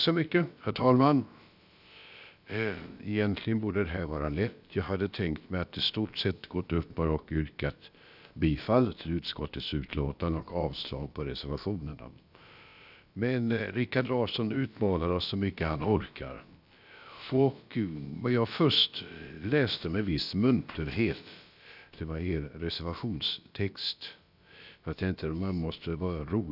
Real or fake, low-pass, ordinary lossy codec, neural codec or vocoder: fake; 5.4 kHz; none; codec, 16 kHz, about 1 kbps, DyCAST, with the encoder's durations